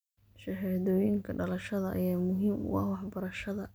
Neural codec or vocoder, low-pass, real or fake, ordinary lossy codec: none; none; real; none